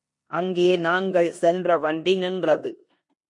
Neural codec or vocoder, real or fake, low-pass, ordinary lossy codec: codec, 16 kHz in and 24 kHz out, 0.9 kbps, LongCat-Audio-Codec, four codebook decoder; fake; 10.8 kHz; MP3, 48 kbps